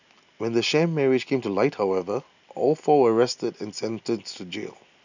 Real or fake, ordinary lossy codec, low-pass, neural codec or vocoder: real; none; 7.2 kHz; none